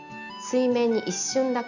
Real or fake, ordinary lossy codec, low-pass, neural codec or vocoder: real; none; 7.2 kHz; none